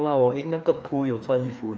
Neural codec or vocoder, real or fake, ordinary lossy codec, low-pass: codec, 16 kHz, 2 kbps, FreqCodec, larger model; fake; none; none